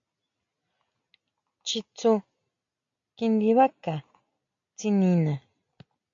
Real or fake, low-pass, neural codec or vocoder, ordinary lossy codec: real; 7.2 kHz; none; AAC, 48 kbps